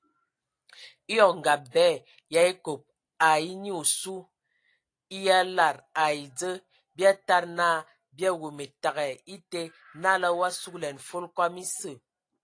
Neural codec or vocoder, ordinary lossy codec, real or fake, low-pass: none; AAC, 48 kbps; real; 9.9 kHz